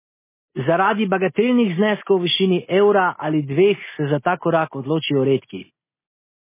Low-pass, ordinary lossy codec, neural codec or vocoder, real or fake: 3.6 kHz; MP3, 16 kbps; none; real